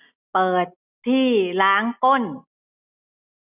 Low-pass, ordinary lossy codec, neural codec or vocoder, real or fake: 3.6 kHz; none; none; real